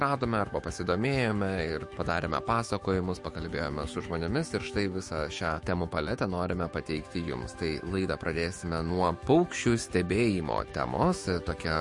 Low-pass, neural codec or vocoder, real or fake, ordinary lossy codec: 10.8 kHz; autoencoder, 48 kHz, 128 numbers a frame, DAC-VAE, trained on Japanese speech; fake; MP3, 48 kbps